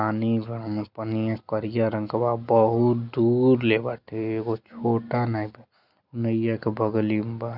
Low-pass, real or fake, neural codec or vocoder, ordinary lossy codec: 5.4 kHz; real; none; none